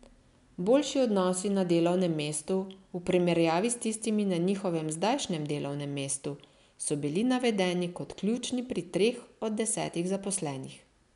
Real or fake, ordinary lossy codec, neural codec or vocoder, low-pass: real; none; none; 10.8 kHz